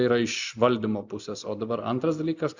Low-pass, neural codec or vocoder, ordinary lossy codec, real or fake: 7.2 kHz; none; Opus, 64 kbps; real